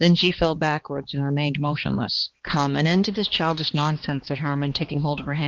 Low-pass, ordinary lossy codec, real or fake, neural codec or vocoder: 7.2 kHz; Opus, 16 kbps; fake; codec, 16 kHz, 2 kbps, X-Codec, HuBERT features, trained on balanced general audio